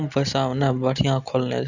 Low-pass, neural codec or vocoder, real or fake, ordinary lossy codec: 7.2 kHz; none; real; Opus, 64 kbps